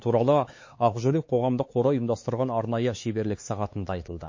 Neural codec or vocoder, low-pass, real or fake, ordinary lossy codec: codec, 16 kHz, 4 kbps, X-Codec, HuBERT features, trained on LibriSpeech; 7.2 kHz; fake; MP3, 32 kbps